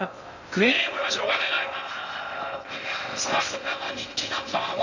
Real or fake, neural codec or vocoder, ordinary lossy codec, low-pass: fake; codec, 16 kHz in and 24 kHz out, 0.6 kbps, FocalCodec, streaming, 2048 codes; none; 7.2 kHz